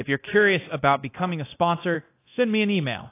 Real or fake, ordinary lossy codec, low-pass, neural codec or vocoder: fake; AAC, 24 kbps; 3.6 kHz; codec, 24 kHz, 0.9 kbps, DualCodec